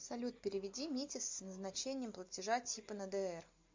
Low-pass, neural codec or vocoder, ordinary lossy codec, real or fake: 7.2 kHz; none; MP3, 64 kbps; real